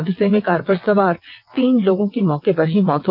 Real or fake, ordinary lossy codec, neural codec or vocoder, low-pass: fake; Opus, 24 kbps; vocoder, 22.05 kHz, 80 mel bands, WaveNeXt; 5.4 kHz